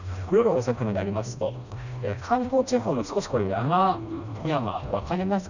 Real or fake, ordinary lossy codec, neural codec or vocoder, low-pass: fake; none; codec, 16 kHz, 1 kbps, FreqCodec, smaller model; 7.2 kHz